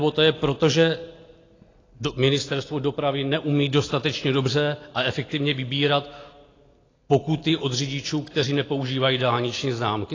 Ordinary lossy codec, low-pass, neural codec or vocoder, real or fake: AAC, 32 kbps; 7.2 kHz; none; real